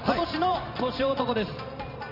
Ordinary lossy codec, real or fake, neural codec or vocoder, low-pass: none; fake; vocoder, 44.1 kHz, 128 mel bands every 512 samples, BigVGAN v2; 5.4 kHz